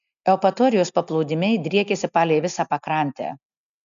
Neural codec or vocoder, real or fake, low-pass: none; real; 7.2 kHz